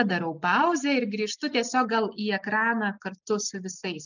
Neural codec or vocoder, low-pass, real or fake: none; 7.2 kHz; real